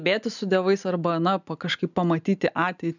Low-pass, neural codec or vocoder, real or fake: 7.2 kHz; none; real